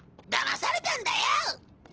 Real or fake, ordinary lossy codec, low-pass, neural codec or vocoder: real; Opus, 16 kbps; 7.2 kHz; none